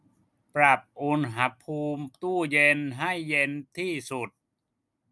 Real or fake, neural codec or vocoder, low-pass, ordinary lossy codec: real; none; none; none